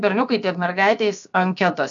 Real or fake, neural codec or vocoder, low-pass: fake; codec, 16 kHz, about 1 kbps, DyCAST, with the encoder's durations; 7.2 kHz